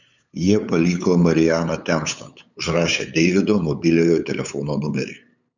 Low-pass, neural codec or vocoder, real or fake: 7.2 kHz; codec, 16 kHz, 8 kbps, FunCodec, trained on LibriTTS, 25 frames a second; fake